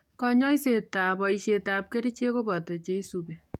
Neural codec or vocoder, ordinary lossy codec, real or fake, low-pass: codec, 44.1 kHz, 7.8 kbps, DAC; none; fake; 19.8 kHz